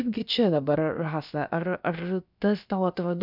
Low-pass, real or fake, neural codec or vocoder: 5.4 kHz; fake; codec, 16 kHz, about 1 kbps, DyCAST, with the encoder's durations